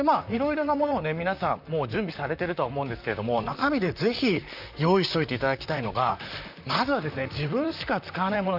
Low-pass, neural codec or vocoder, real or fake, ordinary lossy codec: 5.4 kHz; vocoder, 44.1 kHz, 128 mel bands, Pupu-Vocoder; fake; none